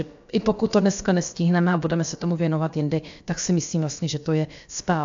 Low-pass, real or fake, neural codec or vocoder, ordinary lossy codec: 7.2 kHz; fake; codec, 16 kHz, about 1 kbps, DyCAST, with the encoder's durations; AAC, 64 kbps